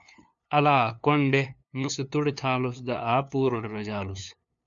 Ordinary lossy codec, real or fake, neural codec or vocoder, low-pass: MP3, 96 kbps; fake; codec, 16 kHz, 2 kbps, FunCodec, trained on LibriTTS, 25 frames a second; 7.2 kHz